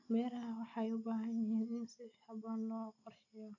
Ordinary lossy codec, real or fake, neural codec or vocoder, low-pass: AAC, 48 kbps; real; none; 7.2 kHz